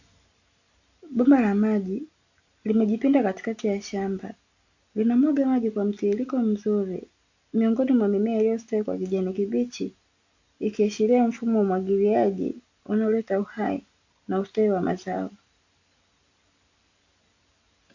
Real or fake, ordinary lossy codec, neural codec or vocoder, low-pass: real; AAC, 48 kbps; none; 7.2 kHz